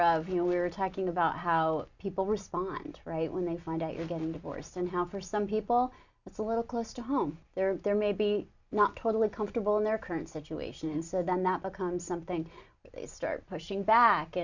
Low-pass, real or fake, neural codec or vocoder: 7.2 kHz; real; none